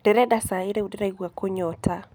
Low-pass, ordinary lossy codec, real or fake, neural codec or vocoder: none; none; real; none